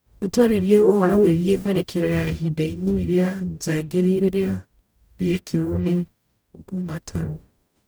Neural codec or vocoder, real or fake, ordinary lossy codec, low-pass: codec, 44.1 kHz, 0.9 kbps, DAC; fake; none; none